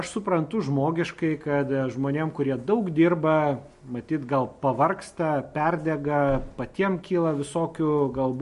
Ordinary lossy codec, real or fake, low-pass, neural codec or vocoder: MP3, 48 kbps; real; 14.4 kHz; none